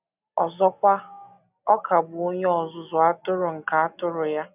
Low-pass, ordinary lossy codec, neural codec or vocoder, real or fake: 3.6 kHz; none; none; real